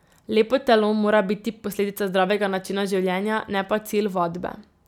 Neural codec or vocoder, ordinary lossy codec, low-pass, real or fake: none; none; 19.8 kHz; real